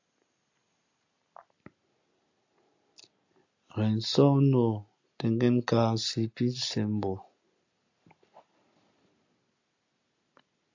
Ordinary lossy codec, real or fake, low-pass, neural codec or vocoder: AAC, 48 kbps; real; 7.2 kHz; none